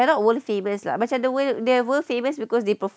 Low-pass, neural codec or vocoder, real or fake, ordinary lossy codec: none; none; real; none